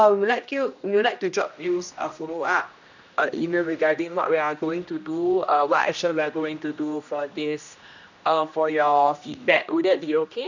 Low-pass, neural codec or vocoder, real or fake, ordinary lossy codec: 7.2 kHz; codec, 16 kHz, 1 kbps, X-Codec, HuBERT features, trained on general audio; fake; none